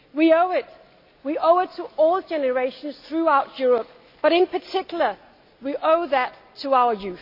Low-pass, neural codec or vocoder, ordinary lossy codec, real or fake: 5.4 kHz; none; AAC, 48 kbps; real